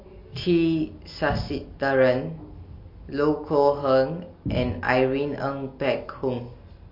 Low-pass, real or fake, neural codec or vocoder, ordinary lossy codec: 5.4 kHz; real; none; MP3, 32 kbps